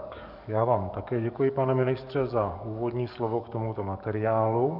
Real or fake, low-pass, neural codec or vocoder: fake; 5.4 kHz; codec, 44.1 kHz, 7.8 kbps, DAC